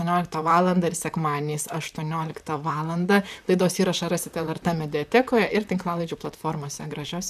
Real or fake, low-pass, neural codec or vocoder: fake; 14.4 kHz; vocoder, 44.1 kHz, 128 mel bands, Pupu-Vocoder